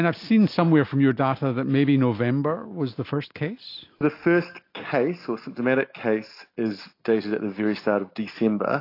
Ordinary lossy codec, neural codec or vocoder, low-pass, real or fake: AAC, 32 kbps; none; 5.4 kHz; real